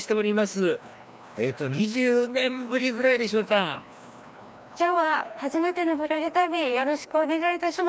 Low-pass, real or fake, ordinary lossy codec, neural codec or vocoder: none; fake; none; codec, 16 kHz, 1 kbps, FreqCodec, larger model